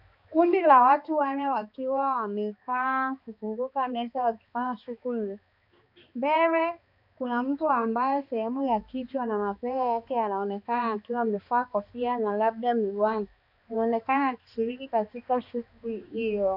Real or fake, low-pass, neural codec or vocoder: fake; 5.4 kHz; codec, 16 kHz, 2 kbps, X-Codec, HuBERT features, trained on balanced general audio